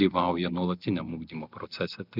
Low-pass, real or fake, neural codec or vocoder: 5.4 kHz; real; none